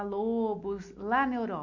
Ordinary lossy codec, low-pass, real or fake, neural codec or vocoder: none; 7.2 kHz; real; none